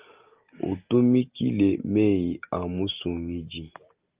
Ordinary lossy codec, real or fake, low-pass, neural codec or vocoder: Opus, 24 kbps; real; 3.6 kHz; none